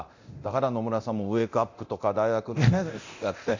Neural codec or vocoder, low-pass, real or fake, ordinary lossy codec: codec, 24 kHz, 0.9 kbps, DualCodec; 7.2 kHz; fake; MP3, 48 kbps